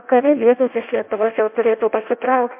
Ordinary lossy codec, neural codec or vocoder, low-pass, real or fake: MP3, 32 kbps; codec, 16 kHz in and 24 kHz out, 0.6 kbps, FireRedTTS-2 codec; 3.6 kHz; fake